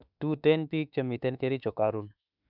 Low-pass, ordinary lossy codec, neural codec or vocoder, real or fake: 5.4 kHz; none; autoencoder, 48 kHz, 32 numbers a frame, DAC-VAE, trained on Japanese speech; fake